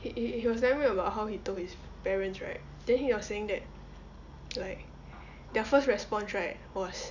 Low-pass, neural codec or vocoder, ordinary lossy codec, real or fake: 7.2 kHz; none; none; real